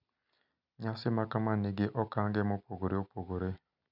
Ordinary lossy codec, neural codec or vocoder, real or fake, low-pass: none; none; real; 5.4 kHz